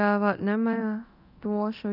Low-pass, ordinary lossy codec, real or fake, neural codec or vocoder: 5.4 kHz; none; fake; codec, 24 kHz, 0.9 kbps, DualCodec